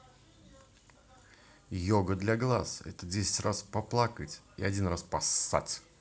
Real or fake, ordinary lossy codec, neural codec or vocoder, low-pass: real; none; none; none